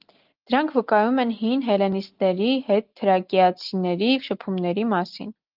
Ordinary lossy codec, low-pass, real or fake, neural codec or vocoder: Opus, 24 kbps; 5.4 kHz; real; none